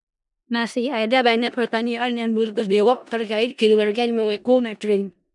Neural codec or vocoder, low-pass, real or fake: codec, 16 kHz in and 24 kHz out, 0.4 kbps, LongCat-Audio-Codec, four codebook decoder; 10.8 kHz; fake